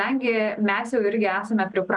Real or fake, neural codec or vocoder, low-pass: real; none; 10.8 kHz